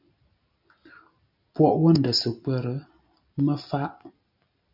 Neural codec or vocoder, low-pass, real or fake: none; 5.4 kHz; real